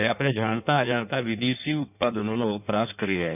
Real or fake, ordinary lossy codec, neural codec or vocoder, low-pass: fake; none; codec, 16 kHz in and 24 kHz out, 1.1 kbps, FireRedTTS-2 codec; 3.6 kHz